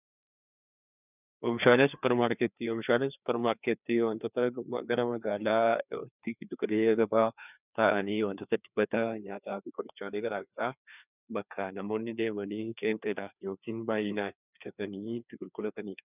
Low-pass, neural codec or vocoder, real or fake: 3.6 kHz; codec, 16 kHz, 2 kbps, FreqCodec, larger model; fake